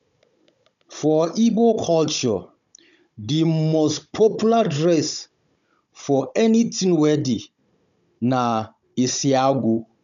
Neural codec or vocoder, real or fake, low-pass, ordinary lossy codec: codec, 16 kHz, 16 kbps, FunCodec, trained on Chinese and English, 50 frames a second; fake; 7.2 kHz; none